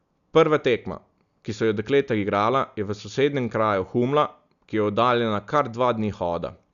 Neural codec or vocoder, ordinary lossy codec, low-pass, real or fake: none; none; 7.2 kHz; real